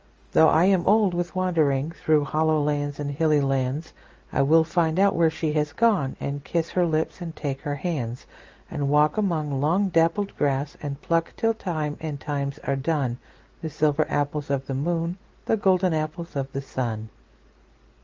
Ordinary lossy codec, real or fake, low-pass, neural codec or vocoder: Opus, 24 kbps; real; 7.2 kHz; none